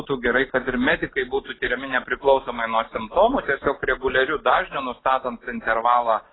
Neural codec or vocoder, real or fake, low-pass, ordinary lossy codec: none; real; 7.2 kHz; AAC, 16 kbps